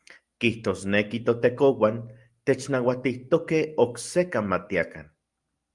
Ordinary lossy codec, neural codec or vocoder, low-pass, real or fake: Opus, 32 kbps; none; 10.8 kHz; real